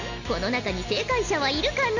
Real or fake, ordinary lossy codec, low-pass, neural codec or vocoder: real; none; 7.2 kHz; none